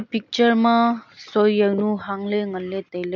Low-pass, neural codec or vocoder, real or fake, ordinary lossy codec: 7.2 kHz; none; real; none